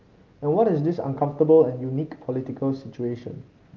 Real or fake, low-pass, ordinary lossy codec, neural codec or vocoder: real; 7.2 kHz; Opus, 32 kbps; none